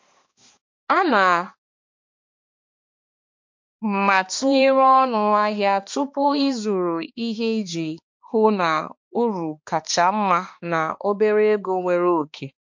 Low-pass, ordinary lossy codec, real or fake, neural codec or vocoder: 7.2 kHz; MP3, 48 kbps; fake; codec, 16 kHz, 2 kbps, X-Codec, HuBERT features, trained on balanced general audio